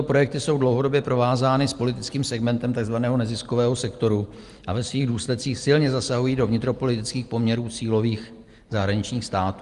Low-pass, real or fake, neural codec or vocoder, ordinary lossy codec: 10.8 kHz; real; none; Opus, 32 kbps